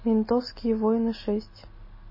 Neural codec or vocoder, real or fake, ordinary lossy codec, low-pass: none; real; MP3, 24 kbps; 5.4 kHz